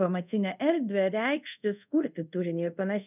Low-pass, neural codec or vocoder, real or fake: 3.6 kHz; codec, 24 kHz, 0.5 kbps, DualCodec; fake